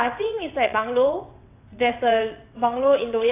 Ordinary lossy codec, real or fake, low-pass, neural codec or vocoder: AAC, 24 kbps; fake; 3.6 kHz; vocoder, 22.05 kHz, 80 mel bands, WaveNeXt